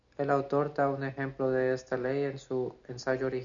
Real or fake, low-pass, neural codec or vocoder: real; 7.2 kHz; none